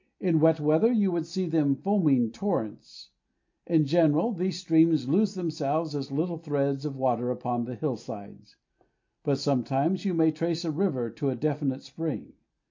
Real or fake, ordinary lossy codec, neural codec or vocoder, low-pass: real; MP3, 48 kbps; none; 7.2 kHz